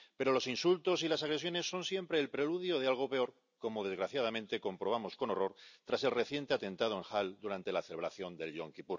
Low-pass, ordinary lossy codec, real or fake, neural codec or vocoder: 7.2 kHz; none; real; none